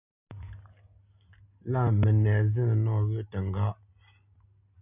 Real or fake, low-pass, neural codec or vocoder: real; 3.6 kHz; none